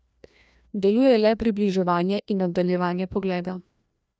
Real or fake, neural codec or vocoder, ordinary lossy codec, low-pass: fake; codec, 16 kHz, 1 kbps, FreqCodec, larger model; none; none